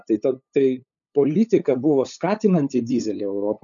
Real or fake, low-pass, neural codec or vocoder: fake; 7.2 kHz; codec, 16 kHz, 8 kbps, FunCodec, trained on LibriTTS, 25 frames a second